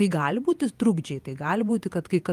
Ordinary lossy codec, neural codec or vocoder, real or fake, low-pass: Opus, 32 kbps; vocoder, 48 kHz, 128 mel bands, Vocos; fake; 14.4 kHz